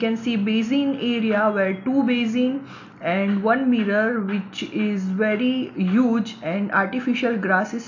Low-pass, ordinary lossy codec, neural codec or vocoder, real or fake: 7.2 kHz; AAC, 48 kbps; none; real